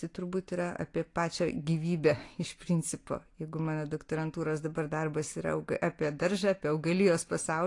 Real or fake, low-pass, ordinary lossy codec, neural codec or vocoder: real; 10.8 kHz; AAC, 48 kbps; none